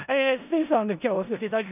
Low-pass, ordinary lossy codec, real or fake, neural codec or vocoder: 3.6 kHz; none; fake; codec, 16 kHz in and 24 kHz out, 0.4 kbps, LongCat-Audio-Codec, four codebook decoder